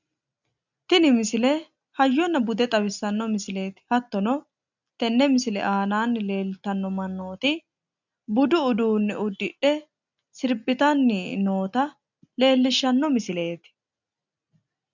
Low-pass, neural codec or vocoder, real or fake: 7.2 kHz; none; real